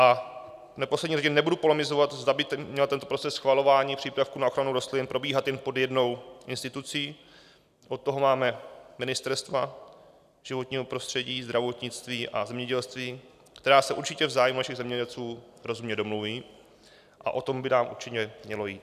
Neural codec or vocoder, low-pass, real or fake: none; 14.4 kHz; real